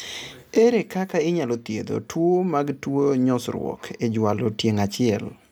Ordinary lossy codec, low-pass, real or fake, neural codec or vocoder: none; 19.8 kHz; real; none